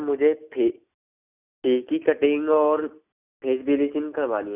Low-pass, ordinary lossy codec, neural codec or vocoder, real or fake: 3.6 kHz; none; none; real